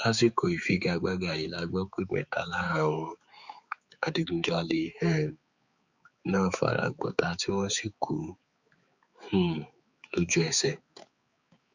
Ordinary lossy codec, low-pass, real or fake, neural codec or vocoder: Opus, 64 kbps; 7.2 kHz; fake; codec, 16 kHz, 4 kbps, X-Codec, HuBERT features, trained on balanced general audio